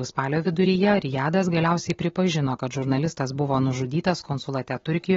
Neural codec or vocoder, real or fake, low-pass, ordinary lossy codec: none; real; 7.2 kHz; AAC, 24 kbps